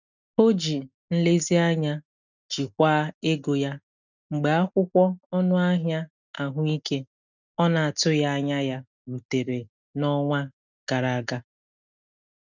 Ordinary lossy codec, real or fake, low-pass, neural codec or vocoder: none; real; 7.2 kHz; none